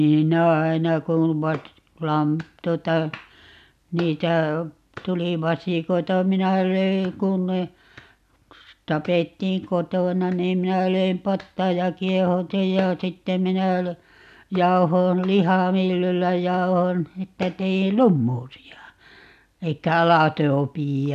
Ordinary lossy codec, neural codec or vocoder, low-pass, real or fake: none; none; 14.4 kHz; real